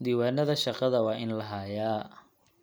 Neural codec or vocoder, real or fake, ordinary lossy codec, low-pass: none; real; none; none